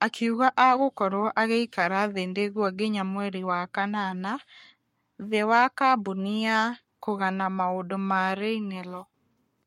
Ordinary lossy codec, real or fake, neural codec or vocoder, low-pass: MP3, 64 kbps; fake; codec, 44.1 kHz, 7.8 kbps, Pupu-Codec; 19.8 kHz